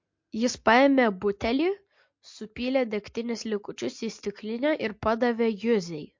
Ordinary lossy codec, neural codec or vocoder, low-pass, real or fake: MP3, 48 kbps; none; 7.2 kHz; real